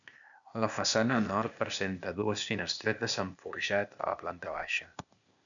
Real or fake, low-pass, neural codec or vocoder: fake; 7.2 kHz; codec, 16 kHz, 0.8 kbps, ZipCodec